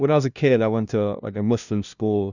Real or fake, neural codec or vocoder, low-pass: fake; codec, 16 kHz, 0.5 kbps, FunCodec, trained on LibriTTS, 25 frames a second; 7.2 kHz